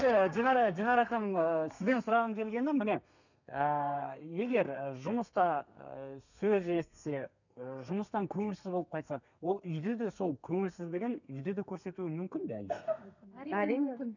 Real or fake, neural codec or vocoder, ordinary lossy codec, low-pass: fake; codec, 32 kHz, 1.9 kbps, SNAC; none; 7.2 kHz